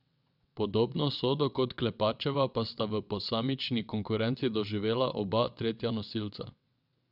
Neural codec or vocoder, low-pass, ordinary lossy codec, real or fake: vocoder, 22.05 kHz, 80 mel bands, WaveNeXt; 5.4 kHz; none; fake